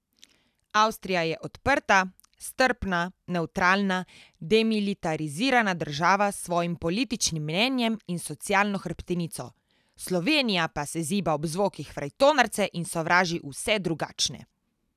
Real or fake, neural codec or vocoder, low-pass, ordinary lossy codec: real; none; 14.4 kHz; none